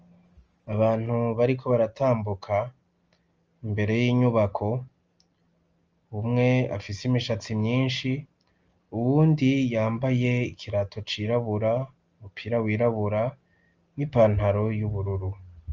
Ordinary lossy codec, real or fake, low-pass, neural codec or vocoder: Opus, 24 kbps; real; 7.2 kHz; none